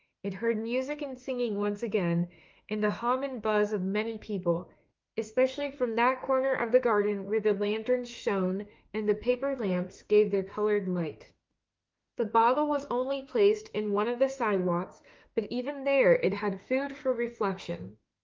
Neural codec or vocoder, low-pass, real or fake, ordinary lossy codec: autoencoder, 48 kHz, 32 numbers a frame, DAC-VAE, trained on Japanese speech; 7.2 kHz; fake; Opus, 32 kbps